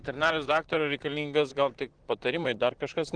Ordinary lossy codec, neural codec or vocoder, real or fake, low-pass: Opus, 16 kbps; vocoder, 44.1 kHz, 128 mel bands, Pupu-Vocoder; fake; 9.9 kHz